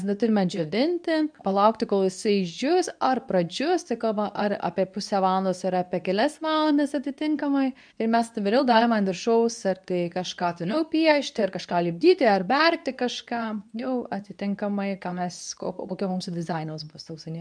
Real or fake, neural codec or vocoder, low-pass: fake; codec, 24 kHz, 0.9 kbps, WavTokenizer, medium speech release version 2; 9.9 kHz